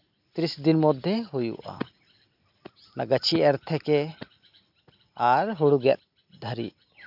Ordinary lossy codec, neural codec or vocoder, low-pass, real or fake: none; none; 5.4 kHz; real